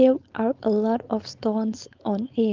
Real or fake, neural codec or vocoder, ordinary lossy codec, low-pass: fake; codec, 16 kHz, 4.8 kbps, FACodec; Opus, 24 kbps; 7.2 kHz